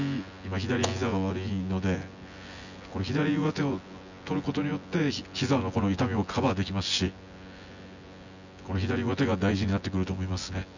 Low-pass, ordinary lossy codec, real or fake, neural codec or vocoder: 7.2 kHz; none; fake; vocoder, 24 kHz, 100 mel bands, Vocos